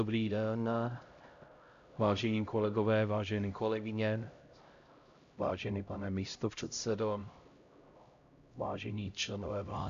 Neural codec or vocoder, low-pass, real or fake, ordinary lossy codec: codec, 16 kHz, 0.5 kbps, X-Codec, HuBERT features, trained on LibriSpeech; 7.2 kHz; fake; Opus, 64 kbps